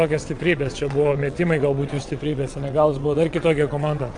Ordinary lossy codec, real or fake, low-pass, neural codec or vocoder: AAC, 64 kbps; fake; 9.9 kHz; vocoder, 22.05 kHz, 80 mel bands, WaveNeXt